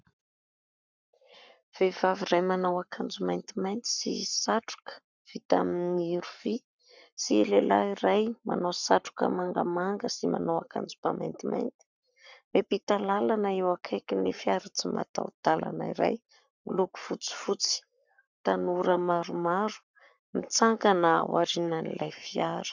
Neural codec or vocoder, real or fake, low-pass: codec, 44.1 kHz, 7.8 kbps, Pupu-Codec; fake; 7.2 kHz